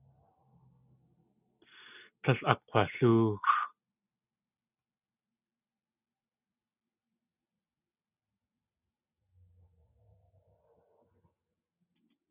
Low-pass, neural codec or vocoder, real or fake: 3.6 kHz; none; real